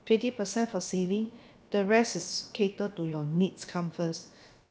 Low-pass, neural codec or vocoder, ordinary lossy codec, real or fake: none; codec, 16 kHz, about 1 kbps, DyCAST, with the encoder's durations; none; fake